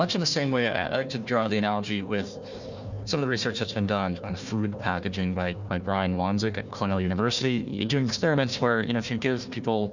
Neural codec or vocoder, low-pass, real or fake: codec, 16 kHz, 1 kbps, FunCodec, trained on Chinese and English, 50 frames a second; 7.2 kHz; fake